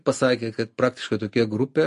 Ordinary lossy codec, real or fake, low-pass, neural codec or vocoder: MP3, 48 kbps; real; 10.8 kHz; none